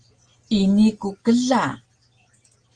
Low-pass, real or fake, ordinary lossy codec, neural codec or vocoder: 9.9 kHz; real; Opus, 24 kbps; none